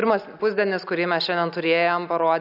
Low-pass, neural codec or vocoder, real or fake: 5.4 kHz; none; real